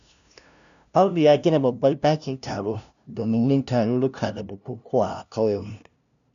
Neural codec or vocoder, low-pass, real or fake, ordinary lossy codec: codec, 16 kHz, 0.5 kbps, FunCodec, trained on LibriTTS, 25 frames a second; 7.2 kHz; fake; none